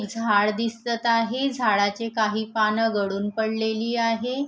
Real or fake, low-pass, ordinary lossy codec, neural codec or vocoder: real; none; none; none